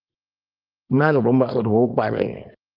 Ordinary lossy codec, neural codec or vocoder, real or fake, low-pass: Opus, 32 kbps; codec, 24 kHz, 0.9 kbps, WavTokenizer, small release; fake; 5.4 kHz